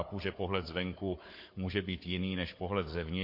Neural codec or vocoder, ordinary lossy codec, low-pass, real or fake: codec, 16 kHz, 4 kbps, FunCodec, trained on Chinese and English, 50 frames a second; MP3, 32 kbps; 5.4 kHz; fake